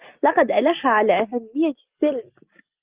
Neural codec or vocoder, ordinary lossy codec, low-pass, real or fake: none; Opus, 32 kbps; 3.6 kHz; real